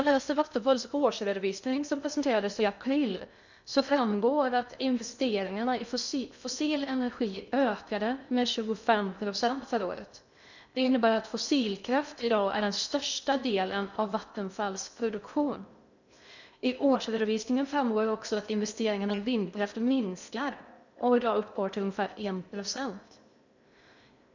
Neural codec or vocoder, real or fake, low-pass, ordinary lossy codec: codec, 16 kHz in and 24 kHz out, 0.6 kbps, FocalCodec, streaming, 2048 codes; fake; 7.2 kHz; none